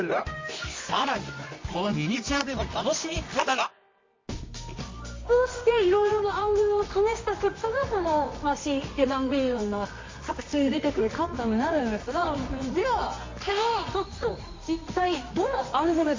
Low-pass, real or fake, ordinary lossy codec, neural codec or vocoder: 7.2 kHz; fake; MP3, 32 kbps; codec, 24 kHz, 0.9 kbps, WavTokenizer, medium music audio release